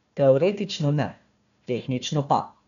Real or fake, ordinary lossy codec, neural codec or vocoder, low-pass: fake; none; codec, 16 kHz, 1 kbps, FunCodec, trained on Chinese and English, 50 frames a second; 7.2 kHz